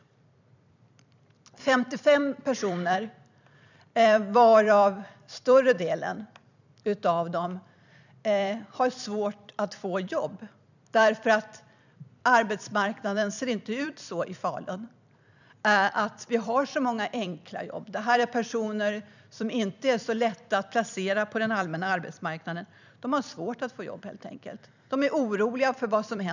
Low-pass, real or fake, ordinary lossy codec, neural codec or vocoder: 7.2 kHz; fake; none; vocoder, 44.1 kHz, 128 mel bands every 256 samples, BigVGAN v2